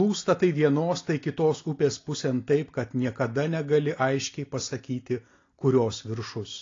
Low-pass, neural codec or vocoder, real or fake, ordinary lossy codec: 7.2 kHz; none; real; AAC, 32 kbps